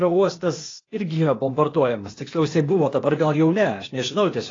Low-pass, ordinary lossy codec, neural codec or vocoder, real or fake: 7.2 kHz; AAC, 32 kbps; codec, 16 kHz, 0.8 kbps, ZipCodec; fake